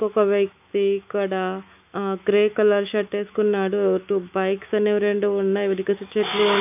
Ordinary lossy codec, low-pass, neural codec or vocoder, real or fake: none; 3.6 kHz; autoencoder, 48 kHz, 128 numbers a frame, DAC-VAE, trained on Japanese speech; fake